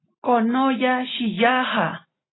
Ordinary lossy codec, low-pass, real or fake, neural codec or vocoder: AAC, 16 kbps; 7.2 kHz; real; none